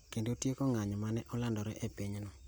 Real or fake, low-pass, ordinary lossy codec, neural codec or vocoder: real; none; none; none